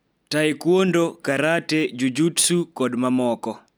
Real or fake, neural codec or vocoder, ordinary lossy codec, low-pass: real; none; none; none